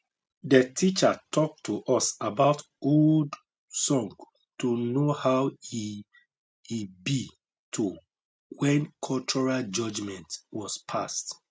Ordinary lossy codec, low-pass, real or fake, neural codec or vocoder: none; none; real; none